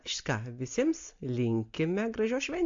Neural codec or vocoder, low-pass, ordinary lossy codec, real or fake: none; 7.2 kHz; MP3, 48 kbps; real